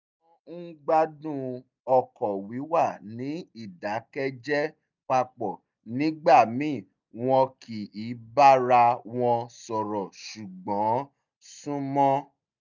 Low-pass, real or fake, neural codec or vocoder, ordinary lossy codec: 7.2 kHz; real; none; none